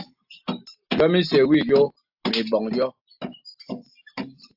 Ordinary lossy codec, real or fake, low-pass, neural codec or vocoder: AAC, 48 kbps; real; 5.4 kHz; none